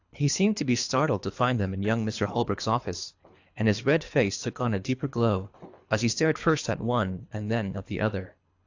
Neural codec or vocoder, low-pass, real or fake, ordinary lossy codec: codec, 24 kHz, 3 kbps, HILCodec; 7.2 kHz; fake; AAC, 48 kbps